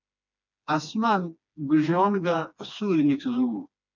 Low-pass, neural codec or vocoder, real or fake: 7.2 kHz; codec, 16 kHz, 2 kbps, FreqCodec, smaller model; fake